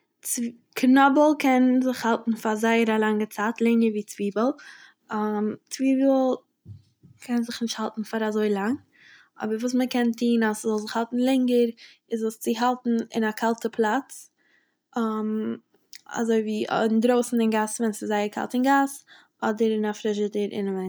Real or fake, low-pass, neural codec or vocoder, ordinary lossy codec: real; none; none; none